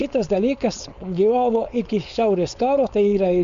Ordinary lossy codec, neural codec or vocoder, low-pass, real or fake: Opus, 64 kbps; codec, 16 kHz, 4.8 kbps, FACodec; 7.2 kHz; fake